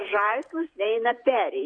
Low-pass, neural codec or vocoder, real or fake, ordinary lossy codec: 9.9 kHz; vocoder, 48 kHz, 128 mel bands, Vocos; fake; AAC, 48 kbps